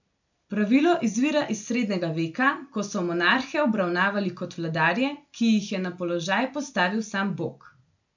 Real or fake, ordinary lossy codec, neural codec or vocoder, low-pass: real; none; none; 7.2 kHz